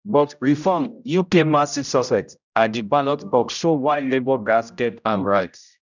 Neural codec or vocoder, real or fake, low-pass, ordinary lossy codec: codec, 16 kHz, 0.5 kbps, X-Codec, HuBERT features, trained on general audio; fake; 7.2 kHz; none